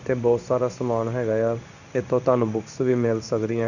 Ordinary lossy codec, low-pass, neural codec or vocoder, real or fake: none; 7.2 kHz; codec, 16 kHz in and 24 kHz out, 1 kbps, XY-Tokenizer; fake